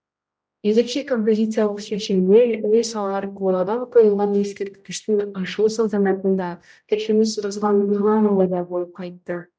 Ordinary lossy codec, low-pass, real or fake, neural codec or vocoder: none; none; fake; codec, 16 kHz, 0.5 kbps, X-Codec, HuBERT features, trained on general audio